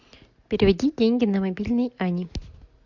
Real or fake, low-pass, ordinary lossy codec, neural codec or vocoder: real; 7.2 kHz; MP3, 64 kbps; none